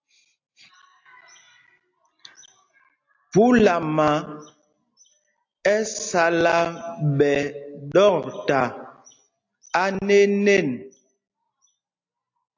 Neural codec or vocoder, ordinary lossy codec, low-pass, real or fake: none; AAC, 48 kbps; 7.2 kHz; real